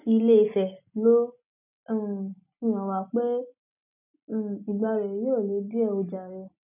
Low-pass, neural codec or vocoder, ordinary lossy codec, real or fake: 3.6 kHz; none; none; real